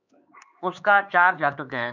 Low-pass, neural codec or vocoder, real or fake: 7.2 kHz; codec, 16 kHz, 4 kbps, X-Codec, HuBERT features, trained on LibriSpeech; fake